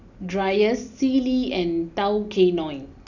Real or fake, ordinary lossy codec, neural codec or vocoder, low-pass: real; none; none; 7.2 kHz